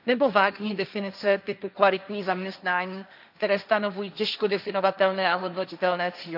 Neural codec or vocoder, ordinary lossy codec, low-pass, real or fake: codec, 16 kHz, 1.1 kbps, Voila-Tokenizer; none; 5.4 kHz; fake